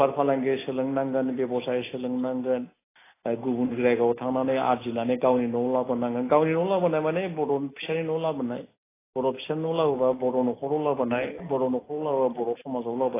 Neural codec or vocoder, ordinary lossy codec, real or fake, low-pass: none; AAC, 16 kbps; real; 3.6 kHz